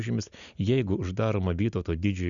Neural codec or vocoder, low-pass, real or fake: none; 7.2 kHz; real